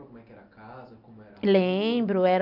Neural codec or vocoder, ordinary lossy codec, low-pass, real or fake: none; none; 5.4 kHz; real